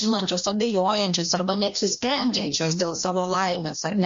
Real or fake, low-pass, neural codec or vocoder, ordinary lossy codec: fake; 7.2 kHz; codec, 16 kHz, 1 kbps, FreqCodec, larger model; MP3, 48 kbps